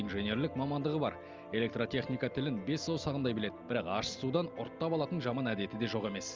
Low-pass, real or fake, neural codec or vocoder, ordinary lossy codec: 7.2 kHz; real; none; Opus, 32 kbps